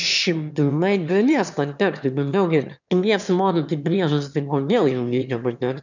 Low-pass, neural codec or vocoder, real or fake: 7.2 kHz; autoencoder, 22.05 kHz, a latent of 192 numbers a frame, VITS, trained on one speaker; fake